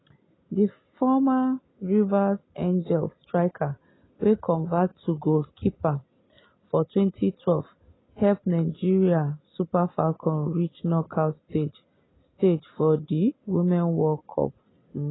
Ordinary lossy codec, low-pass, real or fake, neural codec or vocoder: AAC, 16 kbps; 7.2 kHz; real; none